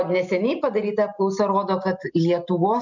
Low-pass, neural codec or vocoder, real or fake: 7.2 kHz; none; real